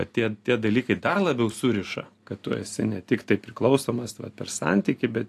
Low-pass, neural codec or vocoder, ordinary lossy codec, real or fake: 14.4 kHz; none; AAC, 64 kbps; real